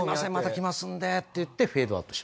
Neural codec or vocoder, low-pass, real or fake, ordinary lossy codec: none; none; real; none